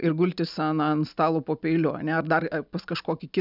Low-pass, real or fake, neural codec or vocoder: 5.4 kHz; real; none